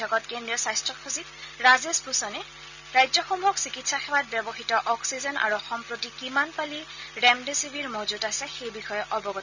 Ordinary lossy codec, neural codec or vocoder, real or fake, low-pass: none; none; real; 7.2 kHz